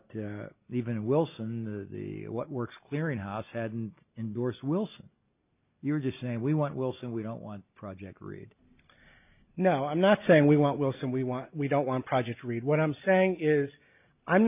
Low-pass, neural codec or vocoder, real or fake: 3.6 kHz; none; real